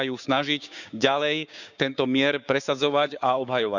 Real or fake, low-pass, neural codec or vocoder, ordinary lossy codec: fake; 7.2 kHz; codec, 16 kHz, 6 kbps, DAC; none